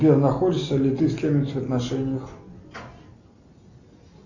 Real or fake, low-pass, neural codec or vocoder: real; 7.2 kHz; none